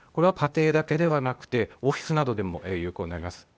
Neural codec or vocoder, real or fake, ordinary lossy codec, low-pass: codec, 16 kHz, 0.8 kbps, ZipCodec; fake; none; none